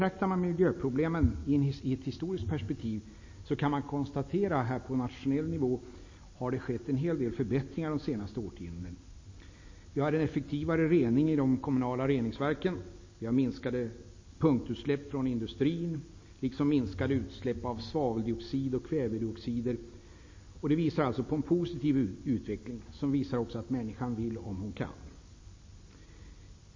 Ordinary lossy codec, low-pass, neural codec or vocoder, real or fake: MP3, 32 kbps; 7.2 kHz; none; real